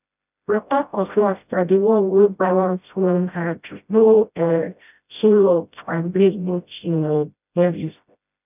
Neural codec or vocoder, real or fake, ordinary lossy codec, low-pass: codec, 16 kHz, 0.5 kbps, FreqCodec, smaller model; fake; none; 3.6 kHz